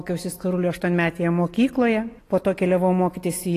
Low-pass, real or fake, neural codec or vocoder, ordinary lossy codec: 14.4 kHz; real; none; AAC, 48 kbps